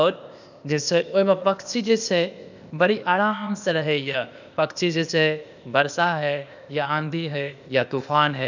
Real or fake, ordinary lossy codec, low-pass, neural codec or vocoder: fake; none; 7.2 kHz; codec, 16 kHz, 0.8 kbps, ZipCodec